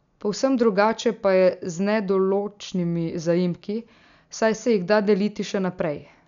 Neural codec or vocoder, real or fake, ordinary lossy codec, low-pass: none; real; none; 7.2 kHz